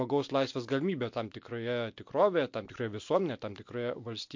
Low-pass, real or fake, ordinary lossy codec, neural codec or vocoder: 7.2 kHz; real; MP3, 48 kbps; none